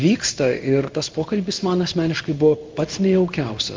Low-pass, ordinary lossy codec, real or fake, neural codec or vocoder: 7.2 kHz; Opus, 32 kbps; fake; codec, 16 kHz in and 24 kHz out, 1 kbps, XY-Tokenizer